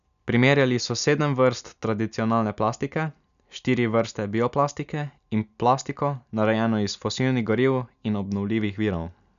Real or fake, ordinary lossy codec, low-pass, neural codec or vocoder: real; none; 7.2 kHz; none